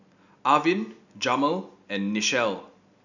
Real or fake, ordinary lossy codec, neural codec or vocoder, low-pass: real; none; none; 7.2 kHz